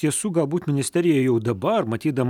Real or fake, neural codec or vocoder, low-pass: real; none; 19.8 kHz